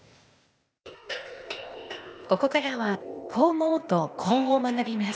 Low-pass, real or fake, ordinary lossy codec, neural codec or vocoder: none; fake; none; codec, 16 kHz, 0.8 kbps, ZipCodec